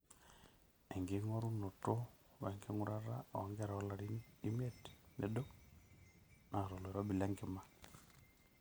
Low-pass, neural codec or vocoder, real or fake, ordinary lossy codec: none; none; real; none